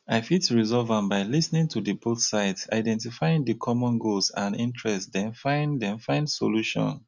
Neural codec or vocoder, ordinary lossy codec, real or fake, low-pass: none; none; real; 7.2 kHz